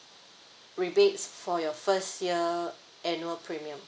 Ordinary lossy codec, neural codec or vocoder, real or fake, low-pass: none; none; real; none